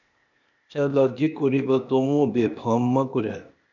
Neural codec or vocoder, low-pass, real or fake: codec, 16 kHz, 0.8 kbps, ZipCodec; 7.2 kHz; fake